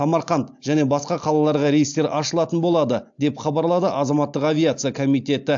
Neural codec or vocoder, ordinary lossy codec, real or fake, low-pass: none; AAC, 64 kbps; real; 7.2 kHz